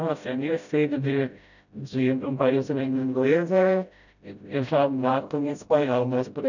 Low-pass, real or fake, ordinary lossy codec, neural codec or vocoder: 7.2 kHz; fake; none; codec, 16 kHz, 0.5 kbps, FreqCodec, smaller model